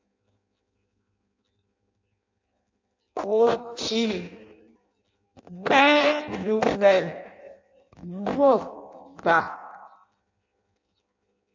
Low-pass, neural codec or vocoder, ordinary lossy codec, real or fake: 7.2 kHz; codec, 16 kHz in and 24 kHz out, 0.6 kbps, FireRedTTS-2 codec; MP3, 48 kbps; fake